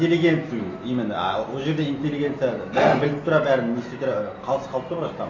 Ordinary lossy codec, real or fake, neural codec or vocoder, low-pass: AAC, 32 kbps; real; none; 7.2 kHz